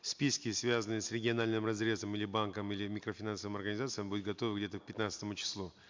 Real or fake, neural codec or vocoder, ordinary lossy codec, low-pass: real; none; MP3, 64 kbps; 7.2 kHz